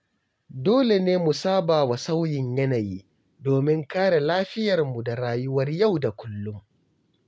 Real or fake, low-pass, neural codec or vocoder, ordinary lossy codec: real; none; none; none